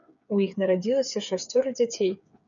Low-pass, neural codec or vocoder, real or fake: 7.2 kHz; codec, 16 kHz, 8 kbps, FreqCodec, smaller model; fake